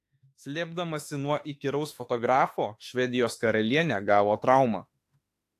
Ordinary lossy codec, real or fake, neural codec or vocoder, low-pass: AAC, 64 kbps; fake; autoencoder, 48 kHz, 32 numbers a frame, DAC-VAE, trained on Japanese speech; 14.4 kHz